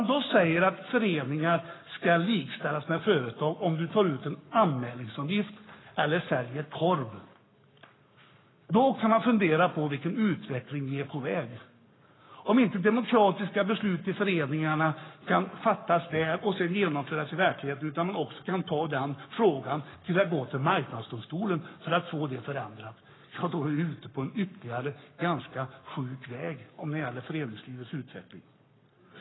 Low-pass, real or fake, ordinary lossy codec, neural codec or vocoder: 7.2 kHz; fake; AAC, 16 kbps; codec, 44.1 kHz, 7.8 kbps, Pupu-Codec